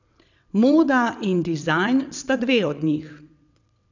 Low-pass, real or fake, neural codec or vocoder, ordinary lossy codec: 7.2 kHz; fake; vocoder, 22.05 kHz, 80 mel bands, WaveNeXt; none